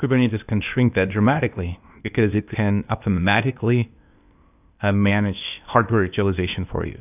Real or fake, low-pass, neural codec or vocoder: fake; 3.6 kHz; codec, 16 kHz, 0.8 kbps, ZipCodec